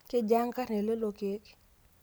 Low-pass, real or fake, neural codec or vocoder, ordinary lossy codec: none; real; none; none